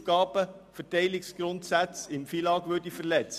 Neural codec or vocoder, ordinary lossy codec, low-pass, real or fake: none; none; 14.4 kHz; real